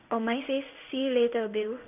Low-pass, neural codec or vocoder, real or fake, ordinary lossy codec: 3.6 kHz; codec, 16 kHz in and 24 kHz out, 1 kbps, XY-Tokenizer; fake; none